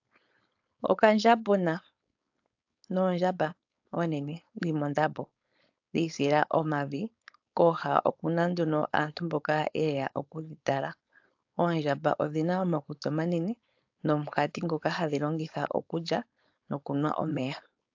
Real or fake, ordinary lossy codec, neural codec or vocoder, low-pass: fake; AAC, 48 kbps; codec, 16 kHz, 4.8 kbps, FACodec; 7.2 kHz